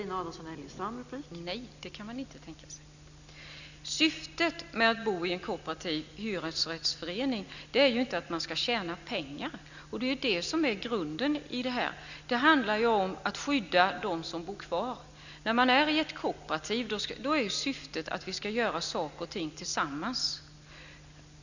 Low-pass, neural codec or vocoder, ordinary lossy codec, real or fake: 7.2 kHz; none; none; real